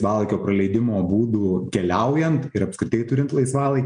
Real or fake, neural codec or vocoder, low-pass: real; none; 9.9 kHz